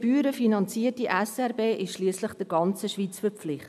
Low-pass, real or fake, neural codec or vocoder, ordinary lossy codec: 14.4 kHz; real; none; none